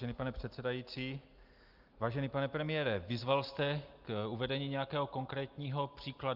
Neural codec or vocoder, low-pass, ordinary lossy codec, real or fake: none; 5.4 kHz; Opus, 24 kbps; real